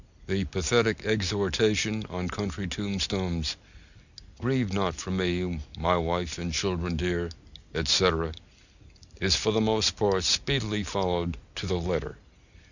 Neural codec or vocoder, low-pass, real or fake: none; 7.2 kHz; real